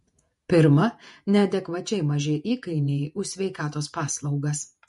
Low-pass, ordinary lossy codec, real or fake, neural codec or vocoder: 14.4 kHz; MP3, 48 kbps; fake; vocoder, 48 kHz, 128 mel bands, Vocos